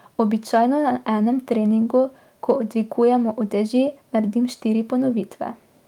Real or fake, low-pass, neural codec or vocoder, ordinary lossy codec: fake; 19.8 kHz; autoencoder, 48 kHz, 128 numbers a frame, DAC-VAE, trained on Japanese speech; Opus, 32 kbps